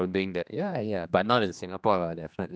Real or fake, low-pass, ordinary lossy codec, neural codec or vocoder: fake; none; none; codec, 16 kHz, 2 kbps, X-Codec, HuBERT features, trained on general audio